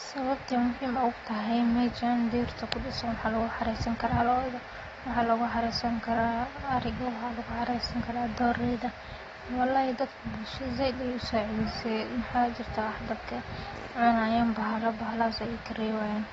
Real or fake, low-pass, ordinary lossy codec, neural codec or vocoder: real; 10.8 kHz; AAC, 24 kbps; none